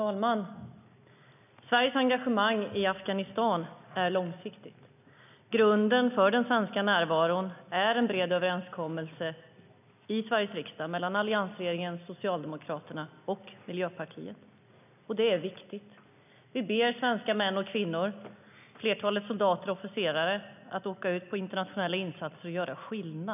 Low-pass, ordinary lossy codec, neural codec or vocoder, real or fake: 3.6 kHz; none; none; real